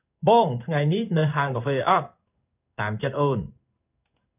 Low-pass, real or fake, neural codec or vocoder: 3.6 kHz; fake; codec, 16 kHz in and 24 kHz out, 1 kbps, XY-Tokenizer